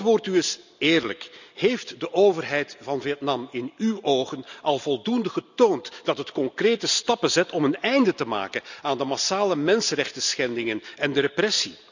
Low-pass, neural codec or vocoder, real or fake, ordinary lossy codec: 7.2 kHz; none; real; none